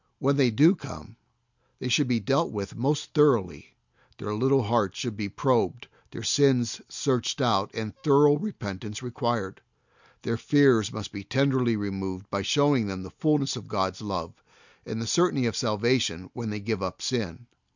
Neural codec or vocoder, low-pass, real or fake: none; 7.2 kHz; real